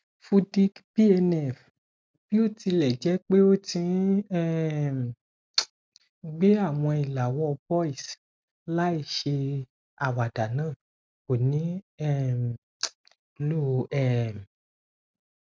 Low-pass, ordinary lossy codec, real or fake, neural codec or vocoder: none; none; real; none